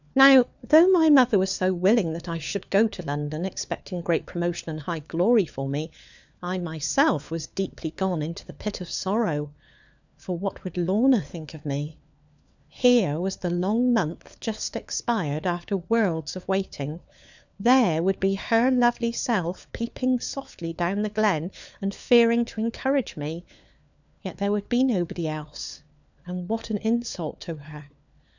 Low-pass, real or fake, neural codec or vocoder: 7.2 kHz; fake; codec, 16 kHz, 4 kbps, FunCodec, trained on LibriTTS, 50 frames a second